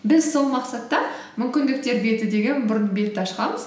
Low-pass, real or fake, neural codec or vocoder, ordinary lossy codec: none; real; none; none